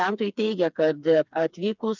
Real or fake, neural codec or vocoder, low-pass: fake; codec, 16 kHz, 4 kbps, FreqCodec, smaller model; 7.2 kHz